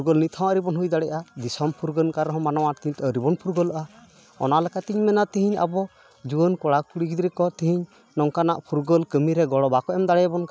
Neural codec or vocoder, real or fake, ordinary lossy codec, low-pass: none; real; none; none